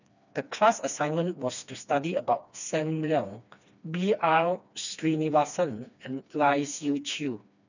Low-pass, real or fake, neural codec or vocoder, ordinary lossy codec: 7.2 kHz; fake; codec, 16 kHz, 2 kbps, FreqCodec, smaller model; none